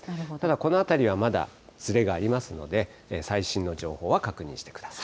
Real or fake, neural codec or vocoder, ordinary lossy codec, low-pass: real; none; none; none